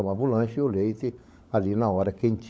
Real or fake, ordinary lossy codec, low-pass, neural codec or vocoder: fake; none; none; codec, 16 kHz, 16 kbps, FunCodec, trained on LibriTTS, 50 frames a second